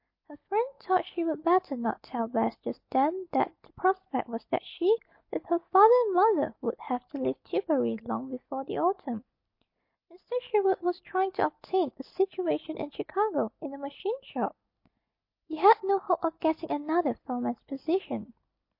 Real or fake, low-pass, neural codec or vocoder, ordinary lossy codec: real; 5.4 kHz; none; MP3, 32 kbps